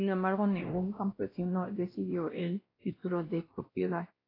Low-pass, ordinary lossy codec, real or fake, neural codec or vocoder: 5.4 kHz; AAC, 24 kbps; fake; codec, 16 kHz, 1 kbps, X-Codec, WavLM features, trained on Multilingual LibriSpeech